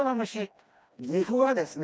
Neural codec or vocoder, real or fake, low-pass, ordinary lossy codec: codec, 16 kHz, 1 kbps, FreqCodec, smaller model; fake; none; none